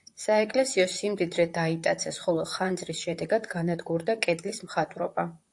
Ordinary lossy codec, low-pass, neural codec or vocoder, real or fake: AAC, 64 kbps; 10.8 kHz; vocoder, 44.1 kHz, 128 mel bands, Pupu-Vocoder; fake